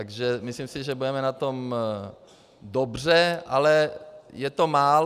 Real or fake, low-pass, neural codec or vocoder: real; 14.4 kHz; none